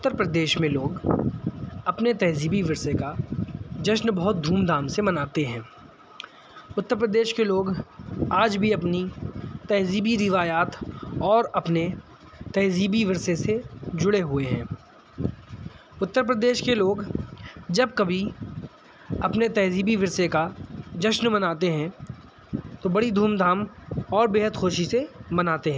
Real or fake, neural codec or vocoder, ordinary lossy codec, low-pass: real; none; none; none